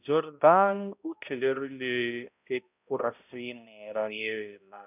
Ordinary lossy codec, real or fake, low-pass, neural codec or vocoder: none; fake; 3.6 kHz; codec, 16 kHz, 0.5 kbps, X-Codec, HuBERT features, trained on balanced general audio